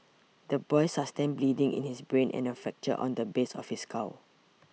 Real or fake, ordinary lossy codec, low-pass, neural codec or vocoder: real; none; none; none